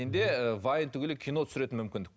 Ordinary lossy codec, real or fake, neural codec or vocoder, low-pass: none; real; none; none